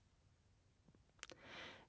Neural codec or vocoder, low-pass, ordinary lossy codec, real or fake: none; none; none; real